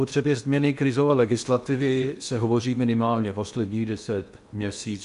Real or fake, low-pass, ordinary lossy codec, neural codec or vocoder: fake; 10.8 kHz; AAC, 96 kbps; codec, 16 kHz in and 24 kHz out, 0.8 kbps, FocalCodec, streaming, 65536 codes